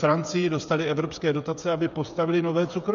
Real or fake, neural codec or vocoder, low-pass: fake; codec, 16 kHz, 8 kbps, FreqCodec, smaller model; 7.2 kHz